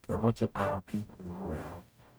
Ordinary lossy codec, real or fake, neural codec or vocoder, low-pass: none; fake; codec, 44.1 kHz, 0.9 kbps, DAC; none